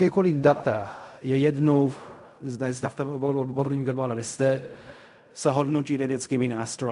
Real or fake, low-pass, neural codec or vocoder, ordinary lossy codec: fake; 10.8 kHz; codec, 16 kHz in and 24 kHz out, 0.4 kbps, LongCat-Audio-Codec, fine tuned four codebook decoder; MP3, 64 kbps